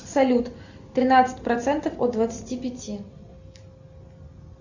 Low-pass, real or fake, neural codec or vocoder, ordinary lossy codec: 7.2 kHz; real; none; Opus, 64 kbps